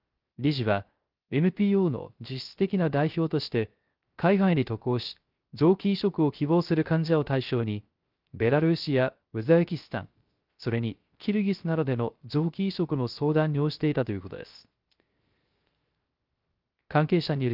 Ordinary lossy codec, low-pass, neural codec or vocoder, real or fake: Opus, 32 kbps; 5.4 kHz; codec, 16 kHz, 0.3 kbps, FocalCodec; fake